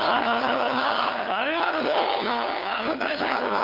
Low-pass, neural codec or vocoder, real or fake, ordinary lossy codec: 5.4 kHz; codec, 16 kHz, 2 kbps, FunCodec, trained on LibriTTS, 25 frames a second; fake; none